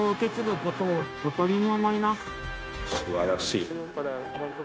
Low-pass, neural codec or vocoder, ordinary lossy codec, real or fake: none; codec, 16 kHz, 0.9 kbps, LongCat-Audio-Codec; none; fake